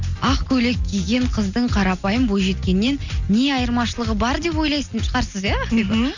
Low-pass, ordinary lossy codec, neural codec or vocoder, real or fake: 7.2 kHz; none; none; real